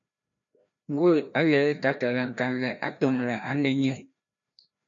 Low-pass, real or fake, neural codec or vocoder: 7.2 kHz; fake; codec, 16 kHz, 1 kbps, FreqCodec, larger model